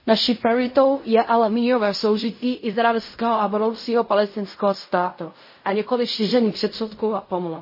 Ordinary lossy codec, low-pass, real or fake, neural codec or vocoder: MP3, 24 kbps; 5.4 kHz; fake; codec, 16 kHz in and 24 kHz out, 0.4 kbps, LongCat-Audio-Codec, fine tuned four codebook decoder